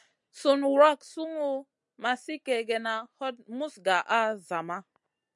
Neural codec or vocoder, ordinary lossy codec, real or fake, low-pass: none; MP3, 96 kbps; real; 10.8 kHz